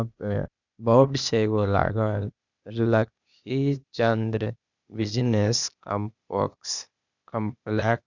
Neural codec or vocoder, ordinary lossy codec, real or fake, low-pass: codec, 16 kHz, 0.8 kbps, ZipCodec; none; fake; 7.2 kHz